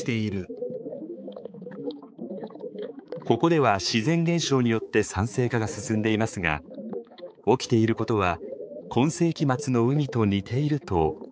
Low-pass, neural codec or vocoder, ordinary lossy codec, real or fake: none; codec, 16 kHz, 4 kbps, X-Codec, HuBERT features, trained on balanced general audio; none; fake